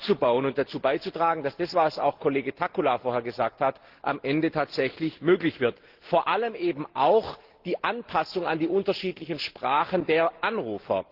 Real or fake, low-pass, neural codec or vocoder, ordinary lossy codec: real; 5.4 kHz; none; Opus, 16 kbps